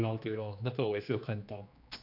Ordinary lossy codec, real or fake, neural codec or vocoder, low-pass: none; fake; codec, 16 kHz, 2 kbps, X-Codec, HuBERT features, trained on general audio; 5.4 kHz